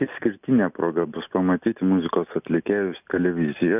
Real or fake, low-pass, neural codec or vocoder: real; 3.6 kHz; none